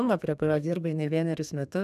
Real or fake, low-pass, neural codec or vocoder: fake; 14.4 kHz; codec, 32 kHz, 1.9 kbps, SNAC